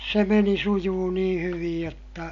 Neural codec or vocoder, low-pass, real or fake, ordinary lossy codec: none; 7.2 kHz; real; MP3, 48 kbps